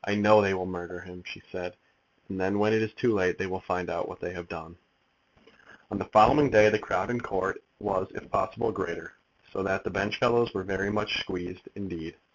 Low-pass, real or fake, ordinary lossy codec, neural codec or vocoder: 7.2 kHz; real; MP3, 64 kbps; none